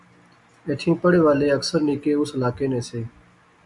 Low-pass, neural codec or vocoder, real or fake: 10.8 kHz; none; real